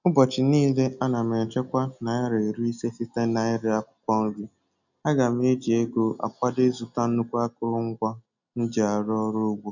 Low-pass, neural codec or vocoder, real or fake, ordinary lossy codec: 7.2 kHz; none; real; none